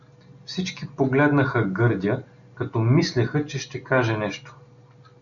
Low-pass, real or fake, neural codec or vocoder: 7.2 kHz; real; none